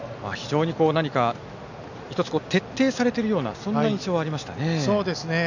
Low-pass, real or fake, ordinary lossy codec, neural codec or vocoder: 7.2 kHz; real; none; none